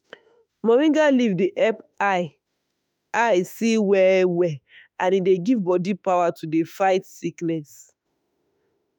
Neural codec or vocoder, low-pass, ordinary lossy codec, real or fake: autoencoder, 48 kHz, 32 numbers a frame, DAC-VAE, trained on Japanese speech; none; none; fake